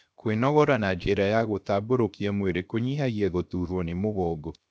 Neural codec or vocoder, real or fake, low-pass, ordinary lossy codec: codec, 16 kHz, 0.7 kbps, FocalCodec; fake; none; none